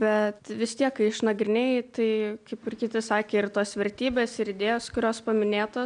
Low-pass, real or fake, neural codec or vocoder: 9.9 kHz; real; none